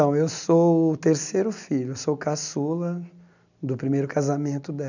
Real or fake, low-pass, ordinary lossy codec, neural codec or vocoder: real; 7.2 kHz; none; none